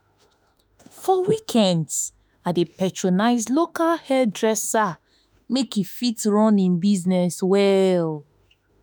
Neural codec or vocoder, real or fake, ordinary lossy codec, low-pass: autoencoder, 48 kHz, 32 numbers a frame, DAC-VAE, trained on Japanese speech; fake; none; none